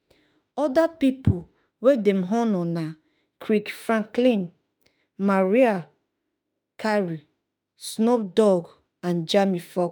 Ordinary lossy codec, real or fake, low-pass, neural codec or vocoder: none; fake; none; autoencoder, 48 kHz, 32 numbers a frame, DAC-VAE, trained on Japanese speech